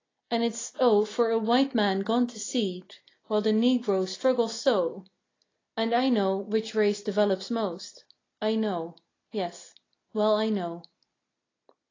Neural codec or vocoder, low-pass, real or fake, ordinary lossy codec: none; 7.2 kHz; real; AAC, 32 kbps